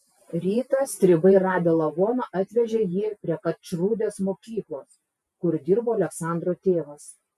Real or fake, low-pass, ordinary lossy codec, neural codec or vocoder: fake; 14.4 kHz; MP3, 96 kbps; vocoder, 48 kHz, 128 mel bands, Vocos